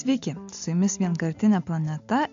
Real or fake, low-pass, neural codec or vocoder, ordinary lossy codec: real; 7.2 kHz; none; MP3, 64 kbps